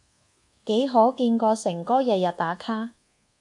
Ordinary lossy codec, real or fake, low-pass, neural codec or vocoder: MP3, 64 kbps; fake; 10.8 kHz; codec, 24 kHz, 1.2 kbps, DualCodec